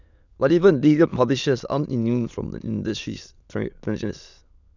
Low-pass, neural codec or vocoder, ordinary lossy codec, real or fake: 7.2 kHz; autoencoder, 22.05 kHz, a latent of 192 numbers a frame, VITS, trained on many speakers; none; fake